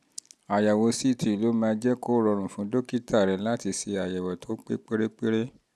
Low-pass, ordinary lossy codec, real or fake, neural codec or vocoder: none; none; real; none